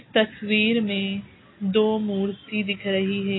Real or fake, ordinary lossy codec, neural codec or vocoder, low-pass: real; AAC, 16 kbps; none; 7.2 kHz